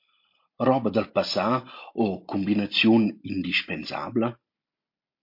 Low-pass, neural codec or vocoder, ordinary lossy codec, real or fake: 5.4 kHz; none; MP3, 32 kbps; real